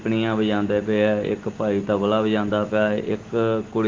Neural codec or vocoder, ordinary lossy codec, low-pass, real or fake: none; Opus, 16 kbps; 7.2 kHz; real